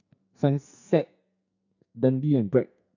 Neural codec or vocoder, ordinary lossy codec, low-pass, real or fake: codec, 44.1 kHz, 2.6 kbps, SNAC; none; 7.2 kHz; fake